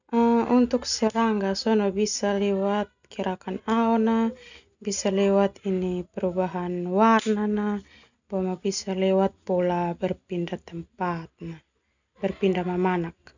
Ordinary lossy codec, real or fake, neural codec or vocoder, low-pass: none; real; none; 7.2 kHz